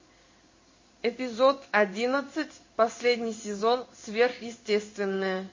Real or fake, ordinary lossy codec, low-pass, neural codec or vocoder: fake; MP3, 32 kbps; 7.2 kHz; codec, 16 kHz in and 24 kHz out, 1 kbps, XY-Tokenizer